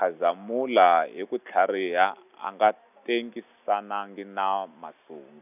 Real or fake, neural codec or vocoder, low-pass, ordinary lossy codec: real; none; 3.6 kHz; none